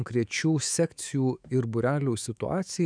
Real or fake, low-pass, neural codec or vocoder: fake; 9.9 kHz; autoencoder, 48 kHz, 128 numbers a frame, DAC-VAE, trained on Japanese speech